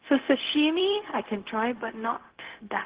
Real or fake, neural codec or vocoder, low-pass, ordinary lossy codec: fake; codec, 16 kHz, 0.4 kbps, LongCat-Audio-Codec; 3.6 kHz; Opus, 16 kbps